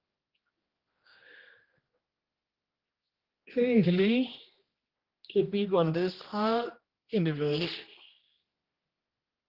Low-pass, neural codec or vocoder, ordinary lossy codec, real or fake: 5.4 kHz; codec, 16 kHz, 1 kbps, X-Codec, HuBERT features, trained on general audio; Opus, 16 kbps; fake